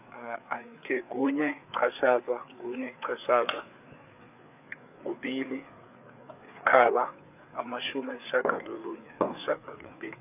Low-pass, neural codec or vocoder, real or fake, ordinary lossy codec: 3.6 kHz; codec, 16 kHz, 4 kbps, FreqCodec, larger model; fake; none